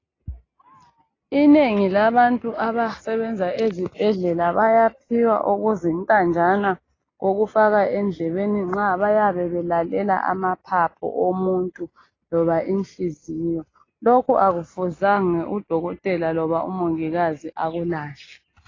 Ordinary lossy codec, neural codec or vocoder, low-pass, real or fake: AAC, 32 kbps; none; 7.2 kHz; real